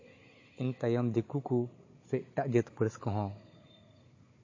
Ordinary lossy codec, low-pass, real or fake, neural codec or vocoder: MP3, 32 kbps; 7.2 kHz; fake; codec, 16 kHz, 16 kbps, FunCodec, trained on Chinese and English, 50 frames a second